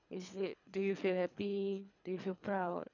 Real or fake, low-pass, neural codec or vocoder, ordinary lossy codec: fake; 7.2 kHz; codec, 24 kHz, 3 kbps, HILCodec; none